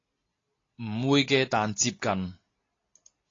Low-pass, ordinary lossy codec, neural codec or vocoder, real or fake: 7.2 kHz; AAC, 32 kbps; none; real